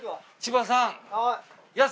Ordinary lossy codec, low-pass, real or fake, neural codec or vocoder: none; none; real; none